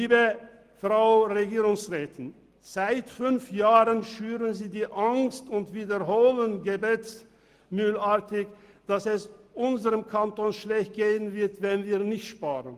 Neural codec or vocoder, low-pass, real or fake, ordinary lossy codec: none; 14.4 kHz; real; Opus, 24 kbps